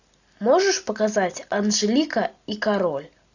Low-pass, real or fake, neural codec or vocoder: 7.2 kHz; real; none